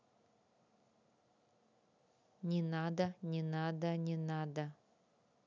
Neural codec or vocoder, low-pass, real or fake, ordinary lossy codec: none; 7.2 kHz; real; none